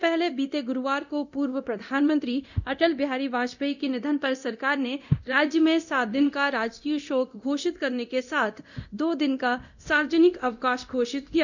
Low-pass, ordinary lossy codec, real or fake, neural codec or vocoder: 7.2 kHz; none; fake; codec, 24 kHz, 0.9 kbps, DualCodec